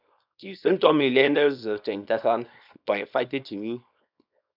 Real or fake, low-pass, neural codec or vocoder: fake; 5.4 kHz; codec, 24 kHz, 0.9 kbps, WavTokenizer, small release